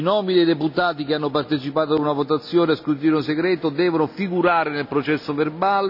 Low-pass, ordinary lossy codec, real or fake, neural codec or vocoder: 5.4 kHz; none; real; none